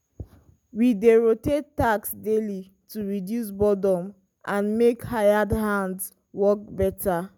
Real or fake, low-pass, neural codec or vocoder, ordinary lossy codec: real; 19.8 kHz; none; none